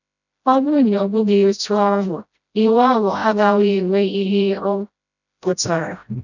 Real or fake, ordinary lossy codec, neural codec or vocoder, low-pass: fake; none; codec, 16 kHz, 0.5 kbps, FreqCodec, smaller model; 7.2 kHz